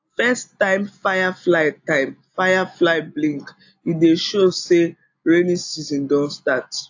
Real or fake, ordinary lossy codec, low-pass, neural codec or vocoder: real; AAC, 48 kbps; 7.2 kHz; none